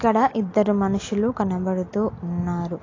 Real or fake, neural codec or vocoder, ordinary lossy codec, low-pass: real; none; AAC, 32 kbps; 7.2 kHz